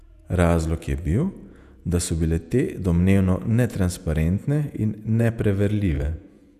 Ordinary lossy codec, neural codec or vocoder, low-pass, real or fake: none; vocoder, 48 kHz, 128 mel bands, Vocos; 14.4 kHz; fake